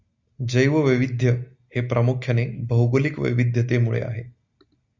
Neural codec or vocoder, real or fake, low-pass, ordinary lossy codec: none; real; 7.2 kHz; Opus, 64 kbps